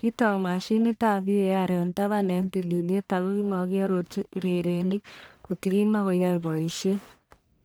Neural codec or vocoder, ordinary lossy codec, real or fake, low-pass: codec, 44.1 kHz, 1.7 kbps, Pupu-Codec; none; fake; none